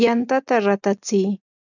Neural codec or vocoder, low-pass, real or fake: none; 7.2 kHz; real